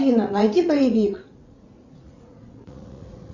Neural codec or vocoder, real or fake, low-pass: vocoder, 44.1 kHz, 80 mel bands, Vocos; fake; 7.2 kHz